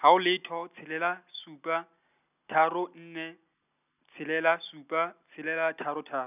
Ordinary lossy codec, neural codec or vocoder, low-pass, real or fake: none; none; 3.6 kHz; real